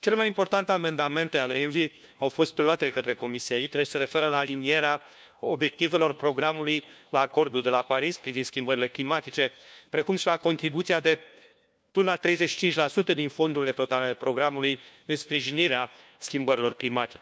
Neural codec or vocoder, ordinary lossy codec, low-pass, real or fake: codec, 16 kHz, 1 kbps, FunCodec, trained on LibriTTS, 50 frames a second; none; none; fake